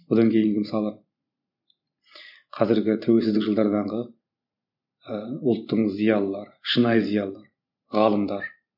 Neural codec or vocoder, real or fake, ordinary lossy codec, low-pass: vocoder, 44.1 kHz, 128 mel bands every 512 samples, BigVGAN v2; fake; none; 5.4 kHz